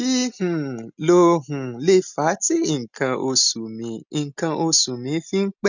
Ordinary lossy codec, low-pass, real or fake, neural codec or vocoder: none; 7.2 kHz; real; none